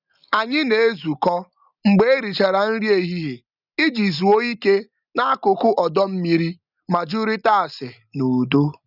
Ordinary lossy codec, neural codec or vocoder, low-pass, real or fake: none; none; 5.4 kHz; real